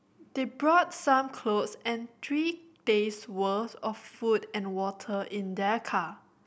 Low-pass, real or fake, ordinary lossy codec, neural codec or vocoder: none; real; none; none